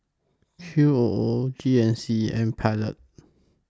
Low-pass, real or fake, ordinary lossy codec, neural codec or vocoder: none; real; none; none